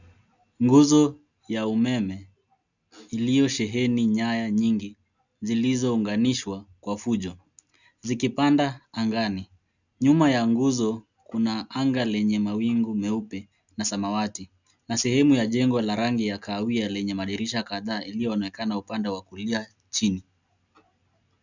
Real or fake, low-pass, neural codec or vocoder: real; 7.2 kHz; none